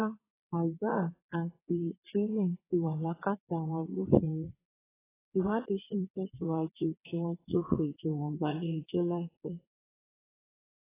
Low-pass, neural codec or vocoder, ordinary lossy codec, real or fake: 3.6 kHz; vocoder, 22.05 kHz, 80 mel bands, WaveNeXt; AAC, 16 kbps; fake